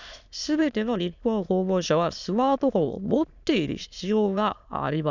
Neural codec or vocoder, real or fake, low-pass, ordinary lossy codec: autoencoder, 22.05 kHz, a latent of 192 numbers a frame, VITS, trained on many speakers; fake; 7.2 kHz; none